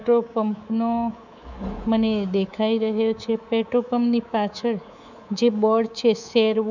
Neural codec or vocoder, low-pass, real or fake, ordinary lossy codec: codec, 24 kHz, 3.1 kbps, DualCodec; 7.2 kHz; fake; none